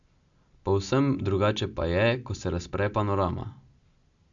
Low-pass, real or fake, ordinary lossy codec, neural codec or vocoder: 7.2 kHz; real; AAC, 64 kbps; none